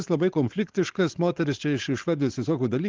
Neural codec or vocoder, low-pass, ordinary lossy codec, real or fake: codec, 16 kHz, 4.8 kbps, FACodec; 7.2 kHz; Opus, 16 kbps; fake